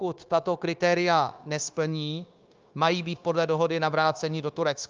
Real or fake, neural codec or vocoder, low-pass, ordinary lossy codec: fake; codec, 16 kHz, 0.9 kbps, LongCat-Audio-Codec; 7.2 kHz; Opus, 24 kbps